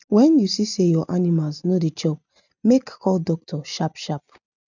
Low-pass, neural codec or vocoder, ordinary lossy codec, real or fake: 7.2 kHz; none; none; real